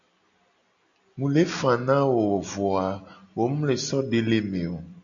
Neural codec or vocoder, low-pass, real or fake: none; 7.2 kHz; real